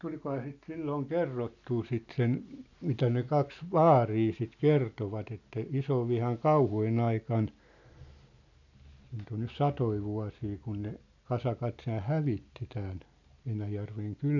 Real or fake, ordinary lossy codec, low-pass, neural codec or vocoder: real; none; 7.2 kHz; none